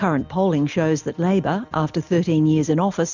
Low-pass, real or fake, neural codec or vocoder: 7.2 kHz; real; none